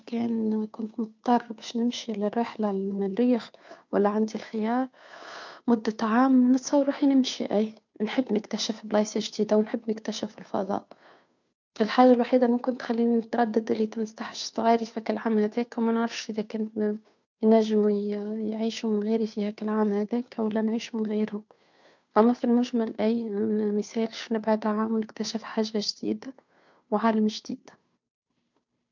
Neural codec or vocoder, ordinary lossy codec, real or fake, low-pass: codec, 16 kHz, 2 kbps, FunCodec, trained on Chinese and English, 25 frames a second; MP3, 64 kbps; fake; 7.2 kHz